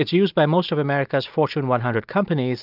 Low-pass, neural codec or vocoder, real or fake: 5.4 kHz; none; real